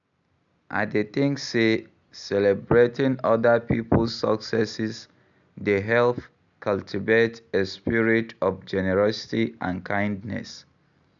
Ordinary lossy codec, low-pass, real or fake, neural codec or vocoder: none; 7.2 kHz; real; none